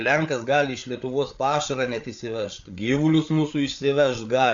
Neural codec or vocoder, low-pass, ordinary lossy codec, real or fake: codec, 16 kHz, 8 kbps, FreqCodec, larger model; 7.2 kHz; AAC, 64 kbps; fake